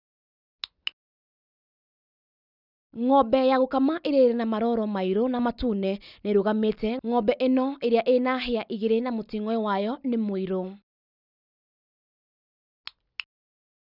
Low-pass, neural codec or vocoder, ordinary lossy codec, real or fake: 5.4 kHz; none; none; real